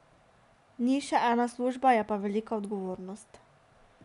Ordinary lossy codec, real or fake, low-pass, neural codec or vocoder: none; real; 10.8 kHz; none